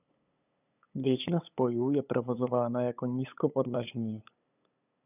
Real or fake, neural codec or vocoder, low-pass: fake; codec, 16 kHz, 8 kbps, FunCodec, trained on LibriTTS, 25 frames a second; 3.6 kHz